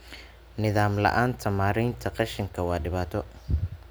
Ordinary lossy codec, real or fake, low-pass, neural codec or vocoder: none; real; none; none